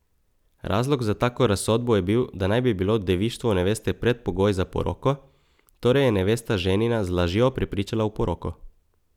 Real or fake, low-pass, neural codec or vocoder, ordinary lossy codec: real; 19.8 kHz; none; none